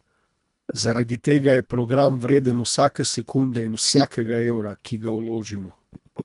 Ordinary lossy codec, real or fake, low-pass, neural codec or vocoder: none; fake; 10.8 kHz; codec, 24 kHz, 1.5 kbps, HILCodec